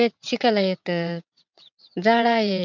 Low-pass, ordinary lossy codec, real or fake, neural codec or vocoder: 7.2 kHz; none; fake; vocoder, 22.05 kHz, 80 mel bands, WaveNeXt